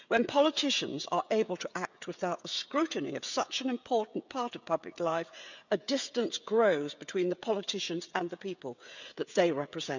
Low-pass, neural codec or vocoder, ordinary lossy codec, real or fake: 7.2 kHz; codec, 16 kHz, 16 kbps, FreqCodec, smaller model; none; fake